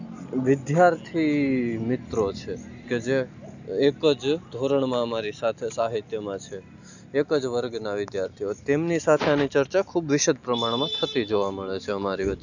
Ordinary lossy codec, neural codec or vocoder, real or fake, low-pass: none; none; real; 7.2 kHz